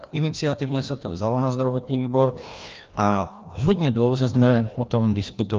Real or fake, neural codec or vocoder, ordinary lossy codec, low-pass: fake; codec, 16 kHz, 1 kbps, FreqCodec, larger model; Opus, 24 kbps; 7.2 kHz